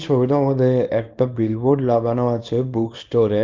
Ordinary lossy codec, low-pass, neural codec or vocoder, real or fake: Opus, 24 kbps; 7.2 kHz; codec, 24 kHz, 0.9 kbps, WavTokenizer, small release; fake